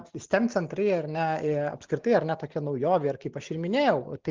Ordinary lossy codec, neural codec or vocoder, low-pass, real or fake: Opus, 24 kbps; none; 7.2 kHz; real